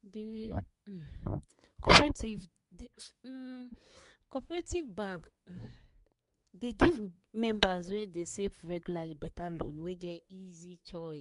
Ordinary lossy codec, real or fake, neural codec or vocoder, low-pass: MP3, 64 kbps; fake; codec, 24 kHz, 1 kbps, SNAC; 10.8 kHz